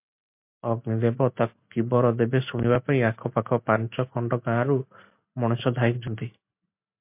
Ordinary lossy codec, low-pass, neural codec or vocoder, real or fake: MP3, 32 kbps; 3.6 kHz; none; real